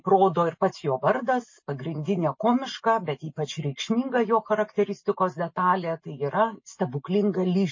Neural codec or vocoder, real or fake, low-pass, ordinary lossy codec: none; real; 7.2 kHz; MP3, 32 kbps